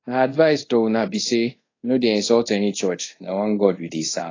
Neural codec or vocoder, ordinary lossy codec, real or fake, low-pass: codec, 24 kHz, 0.5 kbps, DualCodec; AAC, 32 kbps; fake; 7.2 kHz